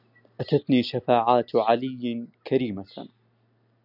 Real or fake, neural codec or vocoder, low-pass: real; none; 5.4 kHz